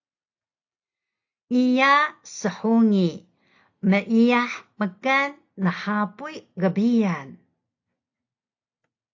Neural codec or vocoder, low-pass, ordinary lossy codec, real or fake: none; 7.2 kHz; AAC, 48 kbps; real